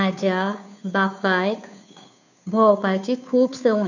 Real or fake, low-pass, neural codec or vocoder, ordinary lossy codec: fake; 7.2 kHz; codec, 16 kHz, 2 kbps, FunCodec, trained on Chinese and English, 25 frames a second; none